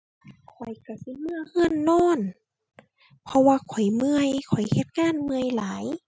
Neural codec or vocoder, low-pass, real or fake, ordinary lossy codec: none; none; real; none